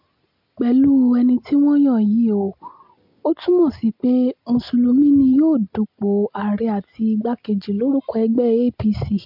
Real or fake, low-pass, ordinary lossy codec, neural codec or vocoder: real; 5.4 kHz; MP3, 48 kbps; none